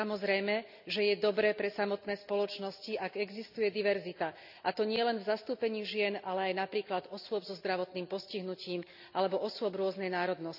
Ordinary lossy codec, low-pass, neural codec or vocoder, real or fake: none; 5.4 kHz; none; real